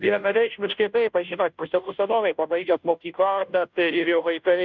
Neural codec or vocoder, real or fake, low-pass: codec, 16 kHz, 0.5 kbps, FunCodec, trained on Chinese and English, 25 frames a second; fake; 7.2 kHz